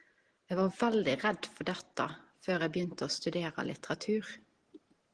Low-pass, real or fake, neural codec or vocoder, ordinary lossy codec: 9.9 kHz; real; none; Opus, 16 kbps